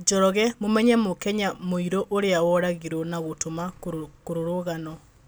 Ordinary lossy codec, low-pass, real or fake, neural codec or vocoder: none; none; real; none